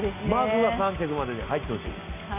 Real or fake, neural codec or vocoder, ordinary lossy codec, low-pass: real; none; none; 3.6 kHz